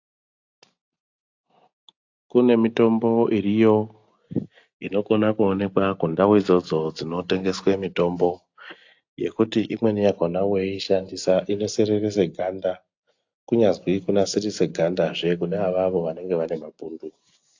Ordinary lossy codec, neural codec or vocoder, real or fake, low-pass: AAC, 48 kbps; none; real; 7.2 kHz